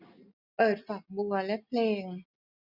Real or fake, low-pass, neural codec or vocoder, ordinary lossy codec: real; 5.4 kHz; none; none